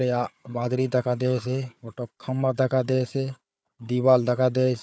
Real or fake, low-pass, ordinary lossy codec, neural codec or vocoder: fake; none; none; codec, 16 kHz, 4 kbps, FunCodec, trained on Chinese and English, 50 frames a second